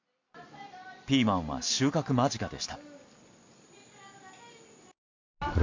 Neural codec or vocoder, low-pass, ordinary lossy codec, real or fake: none; 7.2 kHz; MP3, 48 kbps; real